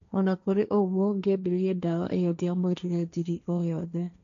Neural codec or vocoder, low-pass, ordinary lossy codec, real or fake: codec, 16 kHz, 1.1 kbps, Voila-Tokenizer; 7.2 kHz; AAC, 96 kbps; fake